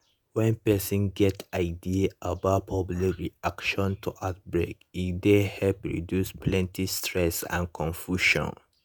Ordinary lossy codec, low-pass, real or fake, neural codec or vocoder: none; none; fake; vocoder, 48 kHz, 128 mel bands, Vocos